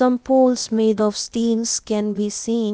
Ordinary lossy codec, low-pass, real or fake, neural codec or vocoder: none; none; fake; codec, 16 kHz, 0.8 kbps, ZipCodec